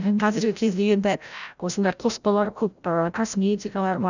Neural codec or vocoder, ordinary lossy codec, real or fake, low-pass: codec, 16 kHz, 0.5 kbps, FreqCodec, larger model; none; fake; 7.2 kHz